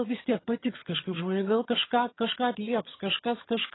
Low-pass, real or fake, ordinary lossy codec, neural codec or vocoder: 7.2 kHz; fake; AAC, 16 kbps; vocoder, 22.05 kHz, 80 mel bands, HiFi-GAN